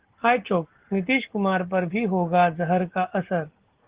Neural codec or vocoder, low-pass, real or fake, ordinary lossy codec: none; 3.6 kHz; real; Opus, 16 kbps